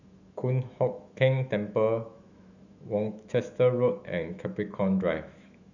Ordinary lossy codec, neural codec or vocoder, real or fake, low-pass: none; autoencoder, 48 kHz, 128 numbers a frame, DAC-VAE, trained on Japanese speech; fake; 7.2 kHz